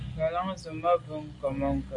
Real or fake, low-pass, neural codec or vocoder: real; 10.8 kHz; none